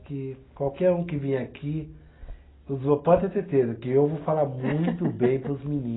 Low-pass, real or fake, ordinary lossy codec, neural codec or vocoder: 7.2 kHz; real; AAC, 16 kbps; none